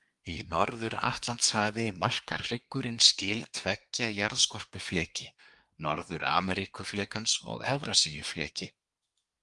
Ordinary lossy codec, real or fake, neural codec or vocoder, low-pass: Opus, 32 kbps; fake; codec, 24 kHz, 1 kbps, SNAC; 10.8 kHz